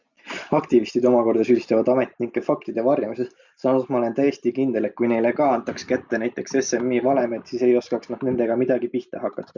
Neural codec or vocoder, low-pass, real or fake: none; 7.2 kHz; real